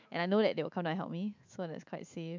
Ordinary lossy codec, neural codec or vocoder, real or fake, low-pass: MP3, 64 kbps; autoencoder, 48 kHz, 128 numbers a frame, DAC-VAE, trained on Japanese speech; fake; 7.2 kHz